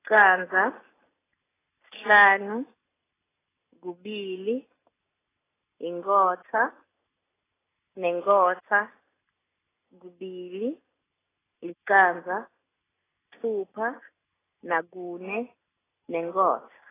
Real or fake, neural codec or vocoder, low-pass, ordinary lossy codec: real; none; 3.6 kHz; AAC, 16 kbps